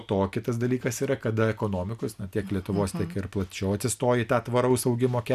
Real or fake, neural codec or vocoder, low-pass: real; none; 14.4 kHz